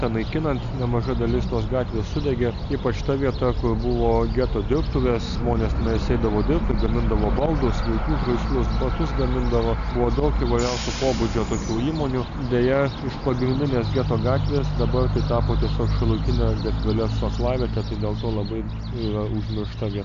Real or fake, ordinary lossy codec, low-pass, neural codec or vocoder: real; AAC, 96 kbps; 7.2 kHz; none